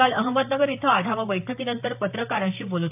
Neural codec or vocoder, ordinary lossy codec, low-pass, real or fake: vocoder, 44.1 kHz, 128 mel bands, Pupu-Vocoder; AAC, 32 kbps; 3.6 kHz; fake